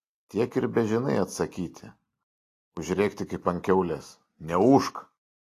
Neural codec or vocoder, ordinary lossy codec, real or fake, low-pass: none; AAC, 48 kbps; real; 14.4 kHz